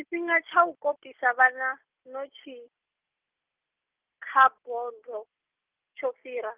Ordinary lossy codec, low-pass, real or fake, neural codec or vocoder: Opus, 32 kbps; 3.6 kHz; real; none